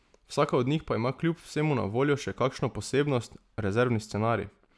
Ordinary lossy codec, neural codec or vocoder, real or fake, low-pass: none; none; real; none